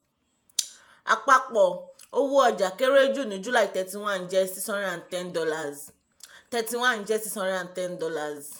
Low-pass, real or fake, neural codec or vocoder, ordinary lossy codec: none; fake; vocoder, 48 kHz, 128 mel bands, Vocos; none